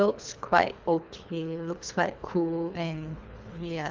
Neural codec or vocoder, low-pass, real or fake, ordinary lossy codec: codec, 24 kHz, 3 kbps, HILCodec; 7.2 kHz; fake; Opus, 32 kbps